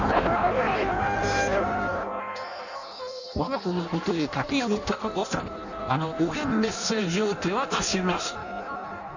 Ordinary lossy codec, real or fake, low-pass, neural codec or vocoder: none; fake; 7.2 kHz; codec, 16 kHz in and 24 kHz out, 0.6 kbps, FireRedTTS-2 codec